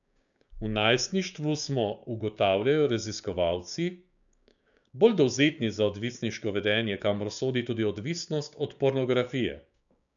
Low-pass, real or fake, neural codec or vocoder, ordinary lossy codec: 7.2 kHz; fake; codec, 16 kHz, 6 kbps, DAC; none